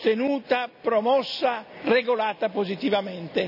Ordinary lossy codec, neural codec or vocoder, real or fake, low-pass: none; none; real; 5.4 kHz